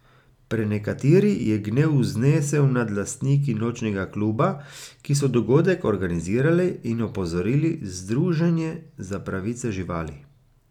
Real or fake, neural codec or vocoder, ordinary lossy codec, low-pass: real; none; none; 19.8 kHz